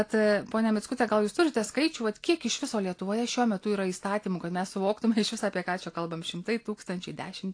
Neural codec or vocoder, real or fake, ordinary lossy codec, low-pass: none; real; AAC, 48 kbps; 9.9 kHz